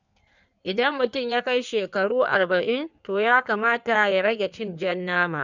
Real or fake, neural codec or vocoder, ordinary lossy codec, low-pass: fake; codec, 16 kHz in and 24 kHz out, 1.1 kbps, FireRedTTS-2 codec; none; 7.2 kHz